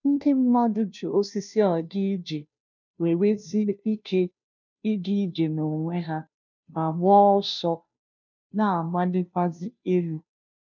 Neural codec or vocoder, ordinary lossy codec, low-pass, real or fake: codec, 16 kHz, 0.5 kbps, FunCodec, trained on Chinese and English, 25 frames a second; none; 7.2 kHz; fake